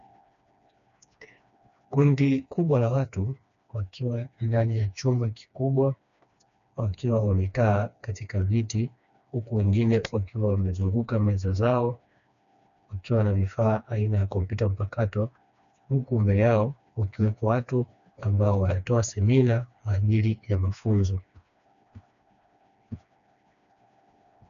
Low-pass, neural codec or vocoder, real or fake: 7.2 kHz; codec, 16 kHz, 2 kbps, FreqCodec, smaller model; fake